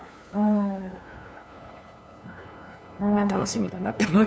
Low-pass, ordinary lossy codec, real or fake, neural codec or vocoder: none; none; fake; codec, 16 kHz, 2 kbps, FunCodec, trained on LibriTTS, 25 frames a second